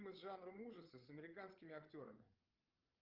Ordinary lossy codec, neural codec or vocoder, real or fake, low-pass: Opus, 24 kbps; codec, 16 kHz, 8 kbps, FreqCodec, larger model; fake; 5.4 kHz